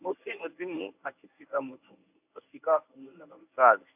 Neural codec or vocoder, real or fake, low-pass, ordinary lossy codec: codec, 24 kHz, 0.9 kbps, WavTokenizer, medium speech release version 1; fake; 3.6 kHz; AAC, 32 kbps